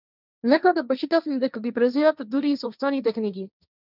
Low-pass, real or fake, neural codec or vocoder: 5.4 kHz; fake; codec, 16 kHz, 1.1 kbps, Voila-Tokenizer